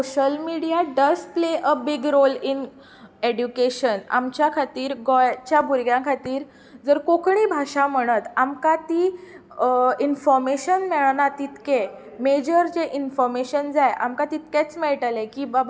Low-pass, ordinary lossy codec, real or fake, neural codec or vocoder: none; none; real; none